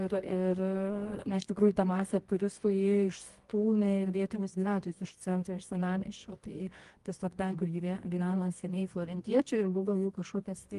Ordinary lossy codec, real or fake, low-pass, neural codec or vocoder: Opus, 24 kbps; fake; 10.8 kHz; codec, 24 kHz, 0.9 kbps, WavTokenizer, medium music audio release